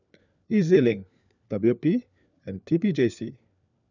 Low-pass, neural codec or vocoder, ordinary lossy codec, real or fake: 7.2 kHz; codec, 16 kHz, 4 kbps, FunCodec, trained on LibriTTS, 50 frames a second; none; fake